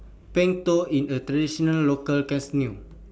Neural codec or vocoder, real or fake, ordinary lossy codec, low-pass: none; real; none; none